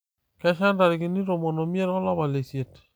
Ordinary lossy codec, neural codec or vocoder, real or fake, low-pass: none; none; real; none